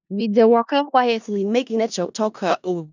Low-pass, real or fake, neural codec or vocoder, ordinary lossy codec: 7.2 kHz; fake; codec, 16 kHz in and 24 kHz out, 0.4 kbps, LongCat-Audio-Codec, four codebook decoder; none